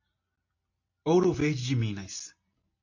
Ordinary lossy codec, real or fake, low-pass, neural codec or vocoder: MP3, 32 kbps; real; 7.2 kHz; none